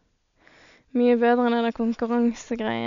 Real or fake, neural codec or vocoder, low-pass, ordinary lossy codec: real; none; 7.2 kHz; none